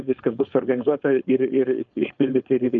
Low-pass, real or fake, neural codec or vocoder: 7.2 kHz; fake; codec, 16 kHz, 4.8 kbps, FACodec